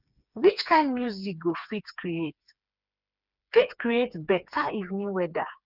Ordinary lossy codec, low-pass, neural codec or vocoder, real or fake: none; 5.4 kHz; codec, 16 kHz, 4 kbps, FreqCodec, smaller model; fake